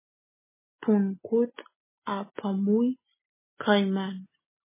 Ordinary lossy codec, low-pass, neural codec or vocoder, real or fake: MP3, 16 kbps; 3.6 kHz; none; real